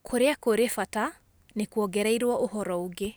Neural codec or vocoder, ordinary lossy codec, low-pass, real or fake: none; none; none; real